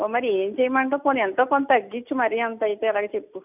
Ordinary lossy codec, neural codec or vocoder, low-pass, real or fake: none; none; 3.6 kHz; real